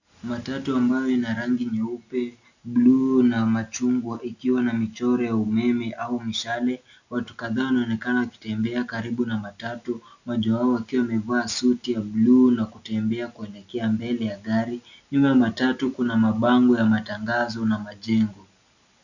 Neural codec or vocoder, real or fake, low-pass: none; real; 7.2 kHz